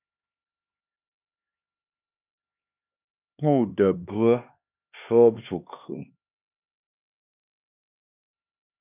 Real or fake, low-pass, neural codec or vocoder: fake; 3.6 kHz; codec, 16 kHz, 2 kbps, X-Codec, HuBERT features, trained on LibriSpeech